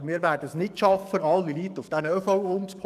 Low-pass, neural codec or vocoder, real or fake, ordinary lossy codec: 14.4 kHz; codec, 44.1 kHz, 7.8 kbps, DAC; fake; none